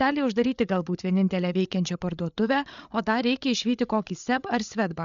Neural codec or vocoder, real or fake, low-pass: codec, 16 kHz, 8 kbps, FreqCodec, larger model; fake; 7.2 kHz